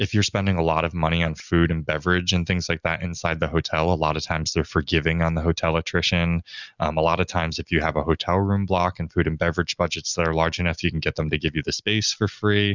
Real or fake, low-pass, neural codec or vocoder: real; 7.2 kHz; none